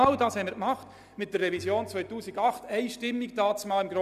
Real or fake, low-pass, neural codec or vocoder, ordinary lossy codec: real; 14.4 kHz; none; none